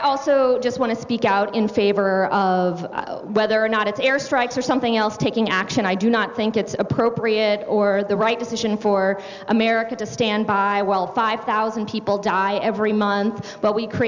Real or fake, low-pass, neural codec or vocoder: real; 7.2 kHz; none